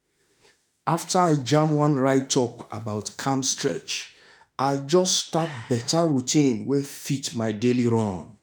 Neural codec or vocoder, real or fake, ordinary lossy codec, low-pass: autoencoder, 48 kHz, 32 numbers a frame, DAC-VAE, trained on Japanese speech; fake; none; none